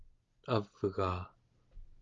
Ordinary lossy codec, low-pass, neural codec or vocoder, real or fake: Opus, 32 kbps; 7.2 kHz; none; real